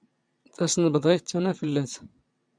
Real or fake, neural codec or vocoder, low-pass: fake; vocoder, 22.05 kHz, 80 mel bands, Vocos; 9.9 kHz